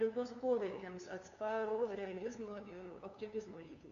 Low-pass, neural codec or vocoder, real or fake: 7.2 kHz; codec, 16 kHz, 2 kbps, FunCodec, trained on LibriTTS, 25 frames a second; fake